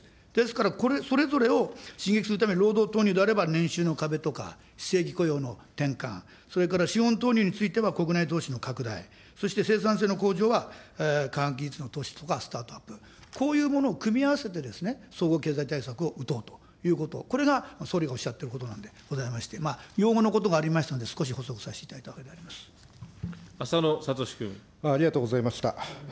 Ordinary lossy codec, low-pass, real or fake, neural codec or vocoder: none; none; real; none